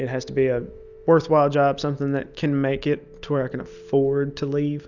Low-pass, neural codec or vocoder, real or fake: 7.2 kHz; none; real